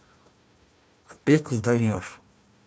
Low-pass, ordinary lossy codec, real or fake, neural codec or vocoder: none; none; fake; codec, 16 kHz, 1 kbps, FunCodec, trained on Chinese and English, 50 frames a second